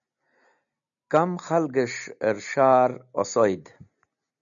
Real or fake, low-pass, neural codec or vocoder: real; 7.2 kHz; none